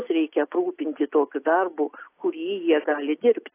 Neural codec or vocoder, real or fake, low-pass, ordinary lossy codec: none; real; 3.6 kHz; AAC, 24 kbps